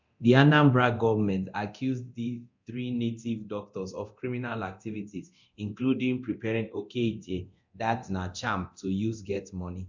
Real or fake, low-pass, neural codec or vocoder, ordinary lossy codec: fake; 7.2 kHz; codec, 24 kHz, 0.9 kbps, DualCodec; MP3, 64 kbps